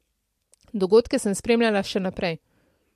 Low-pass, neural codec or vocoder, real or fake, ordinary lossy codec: 14.4 kHz; vocoder, 44.1 kHz, 128 mel bands every 256 samples, BigVGAN v2; fake; MP3, 64 kbps